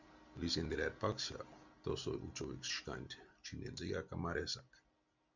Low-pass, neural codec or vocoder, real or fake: 7.2 kHz; none; real